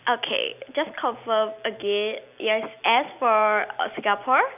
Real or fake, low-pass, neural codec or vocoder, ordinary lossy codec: real; 3.6 kHz; none; none